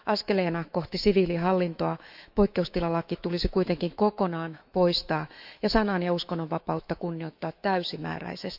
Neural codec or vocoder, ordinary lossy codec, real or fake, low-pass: autoencoder, 48 kHz, 128 numbers a frame, DAC-VAE, trained on Japanese speech; none; fake; 5.4 kHz